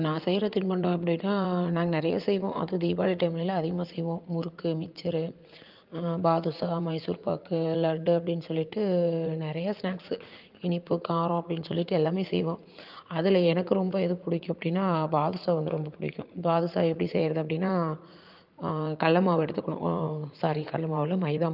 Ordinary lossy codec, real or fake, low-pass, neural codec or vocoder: Opus, 24 kbps; fake; 5.4 kHz; vocoder, 44.1 kHz, 80 mel bands, Vocos